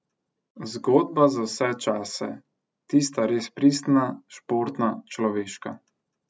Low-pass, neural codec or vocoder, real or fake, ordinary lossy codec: none; none; real; none